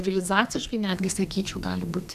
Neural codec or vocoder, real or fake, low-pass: codec, 32 kHz, 1.9 kbps, SNAC; fake; 14.4 kHz